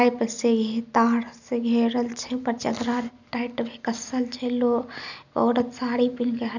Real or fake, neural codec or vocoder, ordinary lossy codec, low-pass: real; none; none; 7.2 kHz